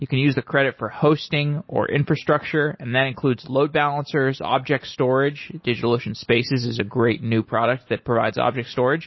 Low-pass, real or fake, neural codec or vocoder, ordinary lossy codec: 7.2 kHz; real; none; MP3, 24 kbps